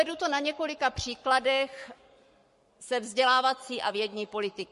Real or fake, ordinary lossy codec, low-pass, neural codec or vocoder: fake; MP3, 48 kbps; 14.4 kHz; codec, 44.1 kHz, 7.8 kbps, Pupu-Codec